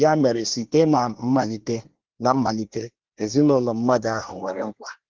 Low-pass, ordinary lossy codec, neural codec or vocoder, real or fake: 7.2 kHz; Opus, 16 kbps; codec, 24 kHz, 1 kbps, SNAC; fake